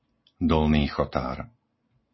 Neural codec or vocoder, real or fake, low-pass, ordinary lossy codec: none; real; 7.2 kHz; MP3, 24 kbps